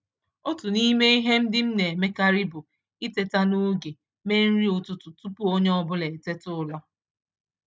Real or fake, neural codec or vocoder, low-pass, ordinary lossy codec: real; none; none; none